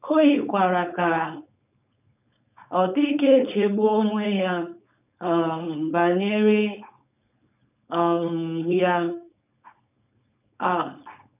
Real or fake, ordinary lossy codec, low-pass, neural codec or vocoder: fake; none; 3.6 kHz; codec, 16 kHz, 4.8 kbps, FACodec